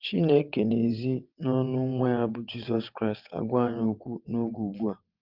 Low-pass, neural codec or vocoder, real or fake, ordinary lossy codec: 5.4 kHz; vocoder, 22.05 kHz, 80 mel bands, WaveNeXt; fake; Opus, 32 kbps